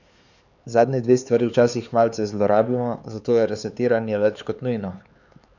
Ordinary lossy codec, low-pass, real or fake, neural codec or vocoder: none; 7.2 kHz; fake; codec, 16 kHz, 4 kbps, X-Codec, HuBERT features, trained on LibriSpeech